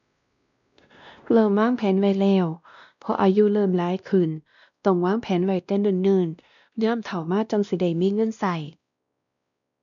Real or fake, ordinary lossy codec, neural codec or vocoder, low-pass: fake; none; codec, 16 kHz, 1 kbps, X-Codec, WavLM features, trained on Multilingual LibriSpeech; 7.2 kHz